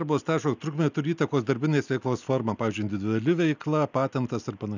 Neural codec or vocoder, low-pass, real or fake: none; 7.2 kHz; real